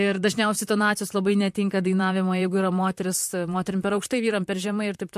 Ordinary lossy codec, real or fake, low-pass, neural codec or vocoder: MP3, 64 kbps; fake; 14.4 kHz; vocoder, 44.1 kHz, 128 mel bands, Pupu-Vocoder